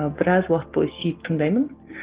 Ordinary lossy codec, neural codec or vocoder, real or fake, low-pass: Opus, 16 kbps; none; real; 3.6 kHz